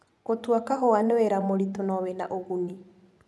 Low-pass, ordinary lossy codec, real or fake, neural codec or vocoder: none; none; fake; vocoder, 24 kHz, 100 mel bands, Vocos